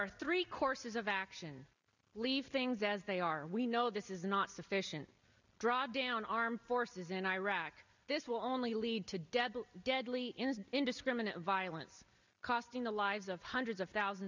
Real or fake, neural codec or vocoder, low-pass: real; none; 7.2 kHz